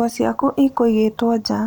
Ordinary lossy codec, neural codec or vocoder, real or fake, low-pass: none; none; real; none